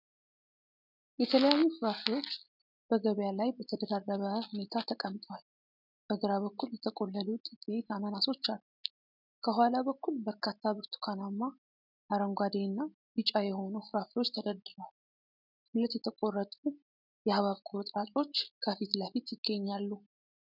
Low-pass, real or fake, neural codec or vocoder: 5.4 kHz; real; none